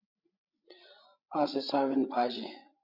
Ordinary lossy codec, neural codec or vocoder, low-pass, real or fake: Opus, 64 kbps; codec, 16 kHz, 16 kbps, FreqCodec, larger model; 5.4 kHz; fake